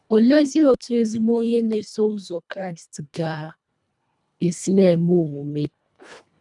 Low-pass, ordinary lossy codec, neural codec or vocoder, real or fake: 10.8 kHz; none; codec, 24 kHz, 1.5 kbps, HILCodec; fake